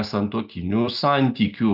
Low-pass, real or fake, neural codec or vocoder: 5.4 kHz; real; none